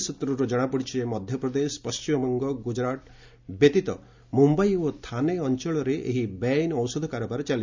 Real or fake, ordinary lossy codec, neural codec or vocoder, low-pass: real; MP3, 64 kbps; none; 7.2 kHz